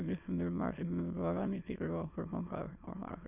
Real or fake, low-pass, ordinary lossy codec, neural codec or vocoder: fake; 3.6 kHz; none; autoencoder, 22.05 kHz, a latent of 192 numbers a frame, VITS, trained on many speakers